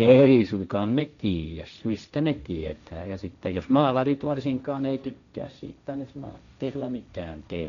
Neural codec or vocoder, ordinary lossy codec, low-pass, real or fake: codec, 16 kHz, 1.1 kbps, Voila-Tokenizer; none; 7.2 kHz; fake